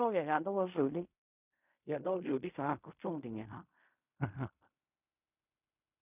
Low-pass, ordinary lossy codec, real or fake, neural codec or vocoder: 3.6 kHz; none; fake; codec, 16 kHz in and 24 kHz out, 0.4 kbps, LongCat-Audio-Codec, fine tuned four codebook decoder